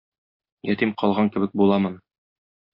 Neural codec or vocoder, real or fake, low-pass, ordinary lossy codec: none; real; 5.4 kHz; MP3, 32 kbps